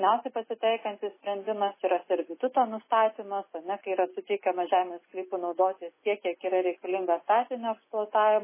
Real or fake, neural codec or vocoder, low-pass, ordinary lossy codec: real; none; 3.6 kHz; MP3, 16 kbps